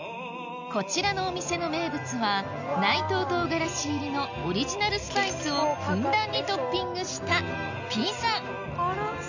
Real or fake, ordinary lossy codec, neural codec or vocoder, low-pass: real; none; none; 7.2 kHz